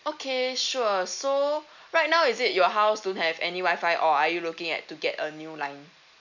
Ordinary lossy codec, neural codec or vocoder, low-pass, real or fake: none; none; 7.2 kHz; real